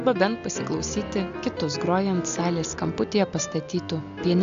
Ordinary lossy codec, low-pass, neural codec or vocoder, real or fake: AAC, 64 kbps; 7.2 kHz; none; real